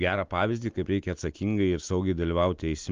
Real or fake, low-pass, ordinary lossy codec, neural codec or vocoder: real; 7.2 kHz; Opus, 24 kbps; none